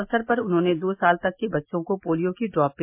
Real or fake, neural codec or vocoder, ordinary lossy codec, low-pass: real; none; MP3, 24 kbps; 3.6 kHz